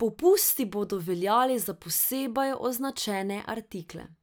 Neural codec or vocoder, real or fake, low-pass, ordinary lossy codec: none; real; none; none